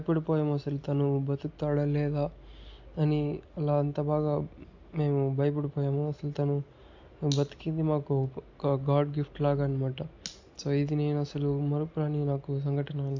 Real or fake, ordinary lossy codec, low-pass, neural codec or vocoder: real; AAC, 48 kbps; 7.2 kHz; none